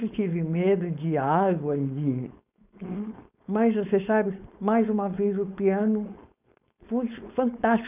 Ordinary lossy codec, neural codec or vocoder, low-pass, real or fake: none; codec, 16 kHz, 4.8 kbps, FACodec; 3.6 kHz; fake